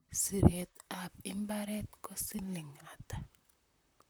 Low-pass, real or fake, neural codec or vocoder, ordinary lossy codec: none; fake; vocoder, 44.1 kHz, 128 mel bands, Pupu-Vocoder; none